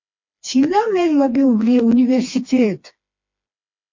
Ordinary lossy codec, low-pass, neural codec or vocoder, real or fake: MP3, 48 kbps; 7.2 kHz; codec, 16 kHz, 2 kbps, FreqCodec, smaller model; fake